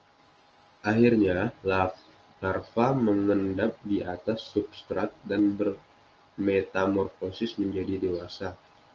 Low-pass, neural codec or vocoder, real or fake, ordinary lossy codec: 7.2 kHz; none; real; Opus, 24 kbps